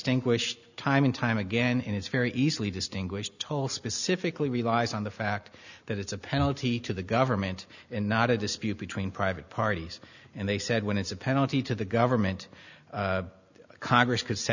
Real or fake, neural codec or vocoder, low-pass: real; none; 7.2 kHz